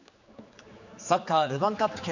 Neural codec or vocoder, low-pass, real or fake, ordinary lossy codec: codec, 16 kHz, 4 kbps, X-Codec, HuBERT features, trained on general audio; 7.2 kHz; fake; none